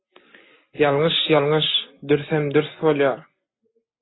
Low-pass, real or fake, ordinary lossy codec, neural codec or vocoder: 7.2 kHz; real; AAC, 16 kbps; none